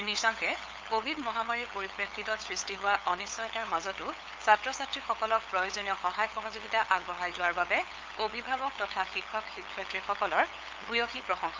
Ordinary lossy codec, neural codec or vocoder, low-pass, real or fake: Opus, 32 kbps; codec, 16 kHz, 8 kbps, FunCodec, trained on LibriTTS, 25 frames a second; 7.2 kHz; fake